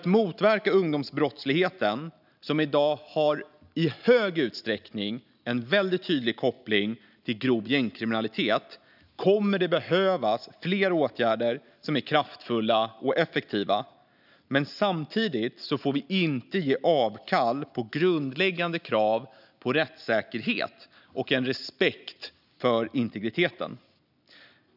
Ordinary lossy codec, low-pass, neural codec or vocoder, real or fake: none; 5.4 kHz; none; real